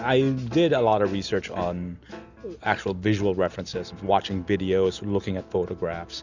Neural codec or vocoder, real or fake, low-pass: none; real; 7.2 kHz